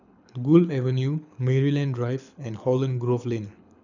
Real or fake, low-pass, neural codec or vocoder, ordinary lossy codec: fake; 7.2 kHz; codec, 24 kHz, 6 kbps, HILCodec; none